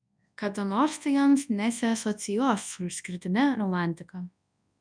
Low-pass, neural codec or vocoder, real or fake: 9.9 kHz; codec, 24 kHz, 0.9 kbps, WavTokenizer, large speech release; fake